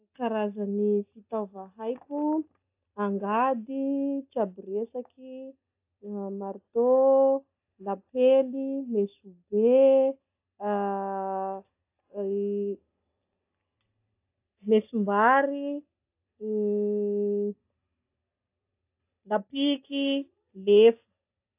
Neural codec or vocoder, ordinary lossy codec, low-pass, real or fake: none; AAC, 32 kbps; 3.6 kHz; real